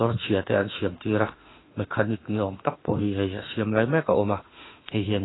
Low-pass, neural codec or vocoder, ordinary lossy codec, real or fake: 7.2 kHz; autoencoder, 48 kHz, 32 numbers a frame, DAC-VAE, trained on Japanese speech; AAC, 16 kbps; fake